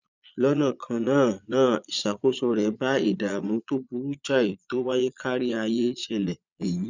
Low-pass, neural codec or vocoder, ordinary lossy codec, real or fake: 7.2 kHz; vocoder, 22.05 kHz, 80 mel bands, Vocos; none; fake